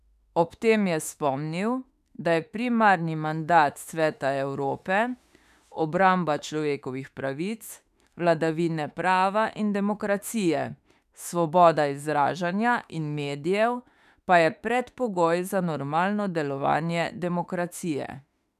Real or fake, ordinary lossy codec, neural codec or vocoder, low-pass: fake; none; autoencoder, 48 kHz, 32 numbers a frame, DAC-VAE, trained on Japanese speech; 14.4 kHz